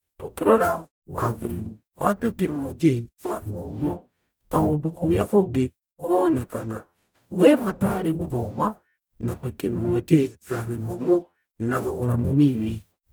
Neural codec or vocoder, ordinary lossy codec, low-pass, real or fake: codec, 44.1 kHz, 0.9 kbps, DAC; none; none; fake